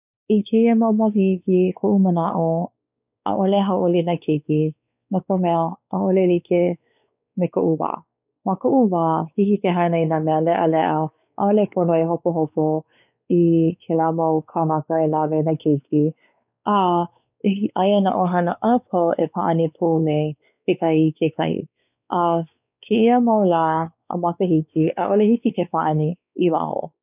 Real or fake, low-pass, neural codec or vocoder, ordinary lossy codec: fake; 3.6 kHz; codec, 16 kHz, 4 kbps, X-Codec, WavLM features, trained on Multilingual LibriSpeech; none